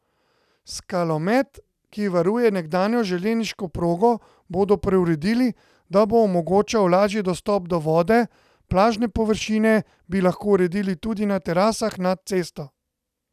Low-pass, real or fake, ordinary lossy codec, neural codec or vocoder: 14.4 kHz; real; none; none